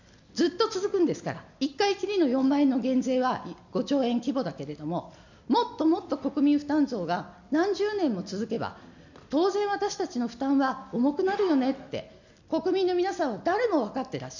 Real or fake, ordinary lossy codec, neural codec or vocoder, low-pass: real; none; none; 7.2 kHz